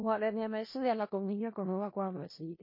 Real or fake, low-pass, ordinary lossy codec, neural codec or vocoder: fake; 5.4 kHz; MP3, 24 kbps; codec, 16 kHz in and 24 kHz out, 0.4 kbps, LongCat-Audio-Codec, four codebook decoder